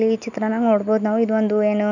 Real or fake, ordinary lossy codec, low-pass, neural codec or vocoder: real; none; 7.2 kHz; none